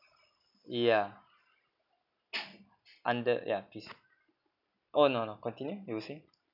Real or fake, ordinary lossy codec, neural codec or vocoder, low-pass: real; none; none; 5.4 kHz